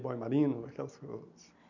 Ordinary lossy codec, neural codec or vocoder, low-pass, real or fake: none; none; 7.2 kHz; real